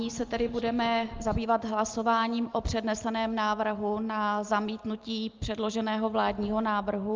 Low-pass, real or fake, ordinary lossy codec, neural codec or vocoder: 7.2 kHz; real; Opus, 24 kbps; none